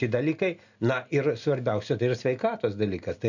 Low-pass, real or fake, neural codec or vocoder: 7.2 kHz; real; none